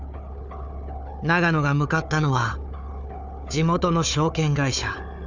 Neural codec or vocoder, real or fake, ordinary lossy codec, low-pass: codec, 16 kHz, 4 kbps, FunCodec, trained on Chinese and English, 50 frames a second; fake; none; 7.2 kHz